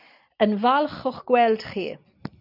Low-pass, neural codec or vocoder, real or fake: 5.4 kHz; none; real